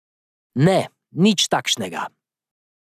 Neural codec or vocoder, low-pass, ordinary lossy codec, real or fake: none; 14.4 kHz; none; real